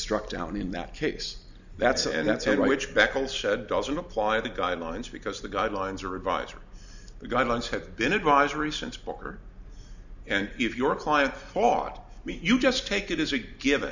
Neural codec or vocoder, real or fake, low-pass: none; real; 7.2 kHz